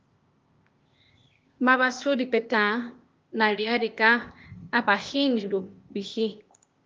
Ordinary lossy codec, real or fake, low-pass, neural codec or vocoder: Opus, 24 kbps; fake; 7.2 kHz; codec, 16 kHz, 0.8 kbps, ZipCodec